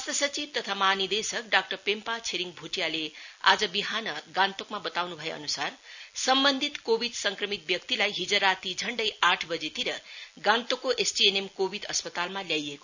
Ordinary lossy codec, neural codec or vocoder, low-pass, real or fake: none; none; 7.2 kHz; real